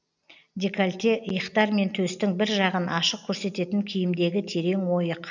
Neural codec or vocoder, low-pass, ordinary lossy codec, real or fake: none; 7.2 kHz; none; real